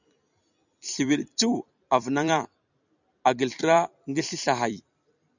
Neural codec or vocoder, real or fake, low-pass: vocoder, 44.1 kHz, 128 mel bands every 256 samples, BigVGAN v2; fake; 7.2 kHz